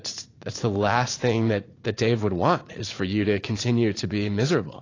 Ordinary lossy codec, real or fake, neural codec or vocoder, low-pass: AAC, 32 kbps; fake; vocoder, 44.1 kHz, 80 mel bands, Vocos; 7.2 kHz